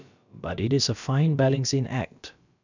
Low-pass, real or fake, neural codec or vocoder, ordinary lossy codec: 7.2 kHz; fake; codec, 16 kHz, about 1 kbps, DyCAST, with the encoder's durations; none